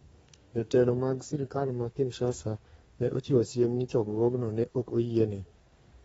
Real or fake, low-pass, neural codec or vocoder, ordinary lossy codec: fake; 14.4 kHz; codec, 32 kHz, 1.9 kbps, SNAC; AAC, 24 kbps